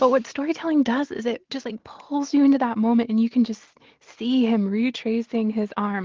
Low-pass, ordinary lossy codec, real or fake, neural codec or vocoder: 7.2 kHz; Opus, 16 kbps; real; none